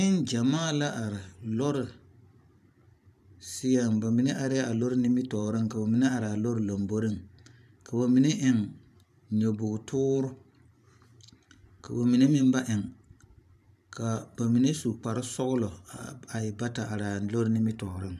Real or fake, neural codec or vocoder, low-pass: fake; vocoder, 44.1 kHz, 128 mel bands every 512 samples, BigVGAN v2; 14.4 kHz